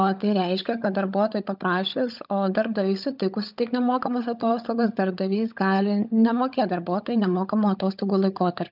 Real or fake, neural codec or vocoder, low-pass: fake; codec, 16 kHz, 16 kbps, FunCodec, trained on LibriTTS, 50 frames a second; 5.4 kHz